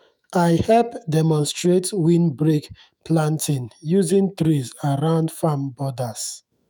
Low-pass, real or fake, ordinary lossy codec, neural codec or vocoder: none; fake; none; autoencoder, 48 kHz, 128 numbers a frame, DAC-VAE, trained on Japanese speech